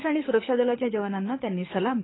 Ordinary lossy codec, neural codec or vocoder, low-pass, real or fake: AAC, 16 kbps; codec, 24 kHz, 6 kbps, HILCodec; 7.2 kHz; fake